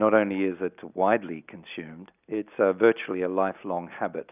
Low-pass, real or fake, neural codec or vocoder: 3.6 kHz; real; none